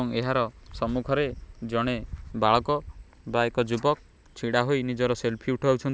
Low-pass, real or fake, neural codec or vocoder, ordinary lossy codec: none; real; none; none